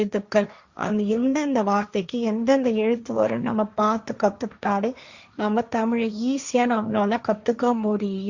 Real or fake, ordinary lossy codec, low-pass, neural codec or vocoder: fake; Opus, 64 kbps; 7.2 kHz; codec, 16 kHz, 1.1 kbps, Voila-Tokenizer